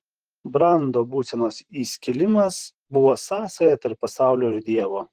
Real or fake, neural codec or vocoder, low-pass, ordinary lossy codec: fake; vocoder, 44.1 kHz, 128 mel bands, Pupu-Vocoder; 14.4 kHz; Opus, 16 kbps